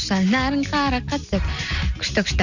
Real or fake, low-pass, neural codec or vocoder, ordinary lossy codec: real; 7.2 kHz; none; none